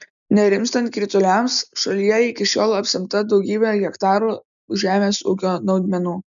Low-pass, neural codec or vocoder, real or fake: 7.2 kHz; none; real